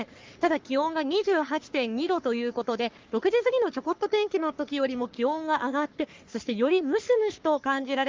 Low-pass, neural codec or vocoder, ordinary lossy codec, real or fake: 7.2 kHz; codec, 44.1 kHz, 3.4 kbps, Pupu-Codec; Opus, 32 kbps; fake